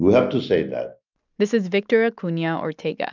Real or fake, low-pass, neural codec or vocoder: real; 7.2 kHz; none